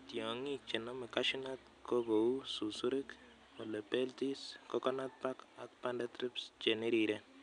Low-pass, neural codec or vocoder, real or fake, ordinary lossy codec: 9.9 kHz; none; real; none